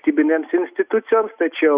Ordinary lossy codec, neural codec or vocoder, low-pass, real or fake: Opus, 64 kbps; none; 3.6 kHz; real